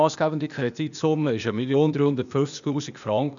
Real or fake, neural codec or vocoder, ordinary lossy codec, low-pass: fake; codec, 16 kHz, 0.8 kbps, ZipCodec; none; 7.2 kHz